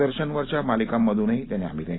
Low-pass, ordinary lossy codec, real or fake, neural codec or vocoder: 7.2 kHz; AAC, 16 kbps; real; none